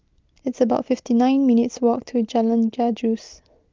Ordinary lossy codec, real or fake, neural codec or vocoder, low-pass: Opus, 24 kbps; real; none; 7.2 kHz